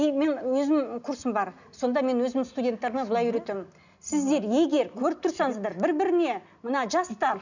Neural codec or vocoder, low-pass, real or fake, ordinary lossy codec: none; 7.2 kHz; real; none